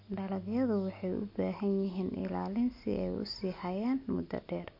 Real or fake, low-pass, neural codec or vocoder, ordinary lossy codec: real; 5.4 kHz; none; MP3, 32 kbps